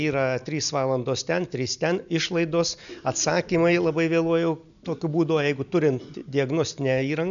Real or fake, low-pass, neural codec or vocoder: real; 7.2 kHz; none